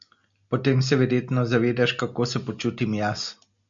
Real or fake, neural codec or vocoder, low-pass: real; none; 7.2 kHz